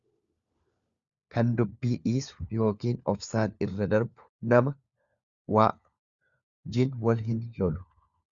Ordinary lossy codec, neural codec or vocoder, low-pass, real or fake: Opus, 64 kbps; codec, 16 kHz, 4 kbps, FunCodec, trained on LibriTTS, 50 frames a second; 7.2 kHz; fake